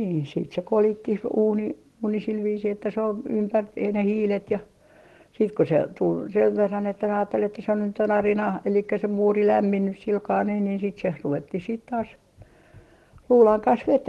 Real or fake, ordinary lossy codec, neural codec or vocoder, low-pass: real; Opus, 16 kbps; none; 14.4 kHz